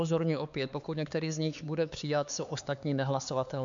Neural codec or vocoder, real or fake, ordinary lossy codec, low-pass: codec, 16 kHz, 4 kbps, X-Codec, HuBERT features, trained on LibriSpeech; fake; MP3, 64 kbps; 7.2 kHz